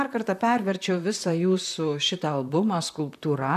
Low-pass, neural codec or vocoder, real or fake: 14.4 kHz; vocoder, 44.1 kHz, 128 mel bands, Pupu-Vocoder; fake